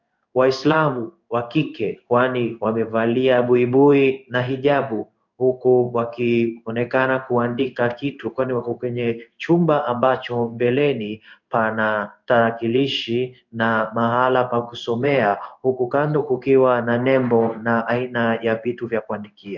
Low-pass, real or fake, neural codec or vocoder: 7.2 kHz; fake; codec, 16 kHz in and 24 kHz out, 1 kbps, XY-Tokenizer